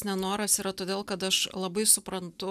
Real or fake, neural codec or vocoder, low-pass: real; none; 14.4 kHz